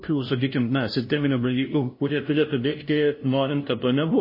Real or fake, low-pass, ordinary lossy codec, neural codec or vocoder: fake; 5.4 kHz; MP3, 24 kbps; codec, 16 kHz, 0.5 kbps, FunCodec, trained on LibriTTS, 25 frames a second